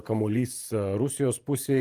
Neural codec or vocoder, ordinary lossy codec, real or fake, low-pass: vocoder, 48 kHz, 128 mel bands, Vocos; Opus, 32 kbps; fake; 14.4 kHz